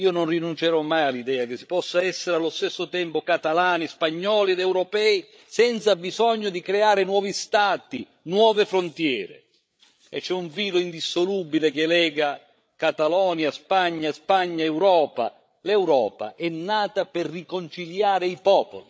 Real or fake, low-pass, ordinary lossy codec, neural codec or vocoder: fake; none; none; codec, 16 kHz, 8 kbps, FreqCodec, larger model